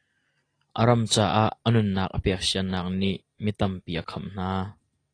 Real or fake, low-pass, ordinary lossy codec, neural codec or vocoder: real; 9.9 kHz; AAC, 32 kbps; none